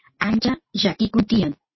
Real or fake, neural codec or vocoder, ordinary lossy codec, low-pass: real; none; MP3, 24 kbps; 7.2 kHz